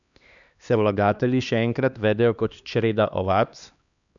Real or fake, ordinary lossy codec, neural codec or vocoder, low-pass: fake; none; codec, 16 kHz, 2 kbps, X-Codec, HuBERT features, trained on LibriSpeech; 7.2 kHz